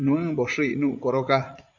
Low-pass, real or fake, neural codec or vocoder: 7.2 kHz; fake; vocoder, 44.1 kHz, 80 mel bands, Vocos